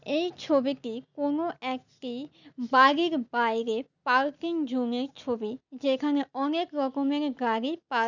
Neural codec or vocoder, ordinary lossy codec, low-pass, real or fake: none; none; 7.2 kHz; real